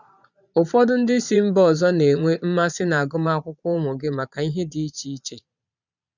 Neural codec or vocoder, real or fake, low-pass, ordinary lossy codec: vocoder, 22.05 kHz, 80 mel bands, Vocos; fake; 7.2 kHz; none